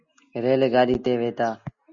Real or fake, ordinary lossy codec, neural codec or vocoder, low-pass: real; Opus, 64 kbps; none; 7.2 kHz